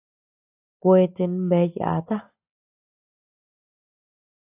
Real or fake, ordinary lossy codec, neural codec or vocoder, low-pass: real; AAC, 24 kbps; none; 3.6 kHz